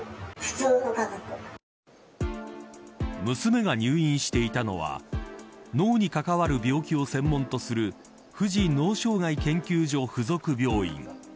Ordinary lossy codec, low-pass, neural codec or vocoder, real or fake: none; none; none; real